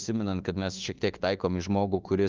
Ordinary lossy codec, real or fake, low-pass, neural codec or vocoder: Opus, 32 kbps; fake; 7.2 kHz; autoencoder, 48 kHz, 32 numbers a frame, DAC-VAE, trained on Japanese speech